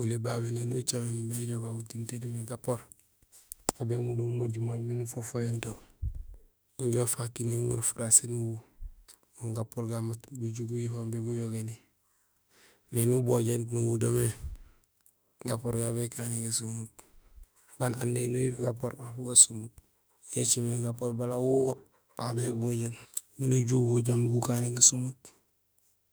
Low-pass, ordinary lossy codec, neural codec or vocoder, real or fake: none; none; autoencoder, 48 kHz, 32 numbers a frame, DAC-VAE, trained on Japanese speech; fake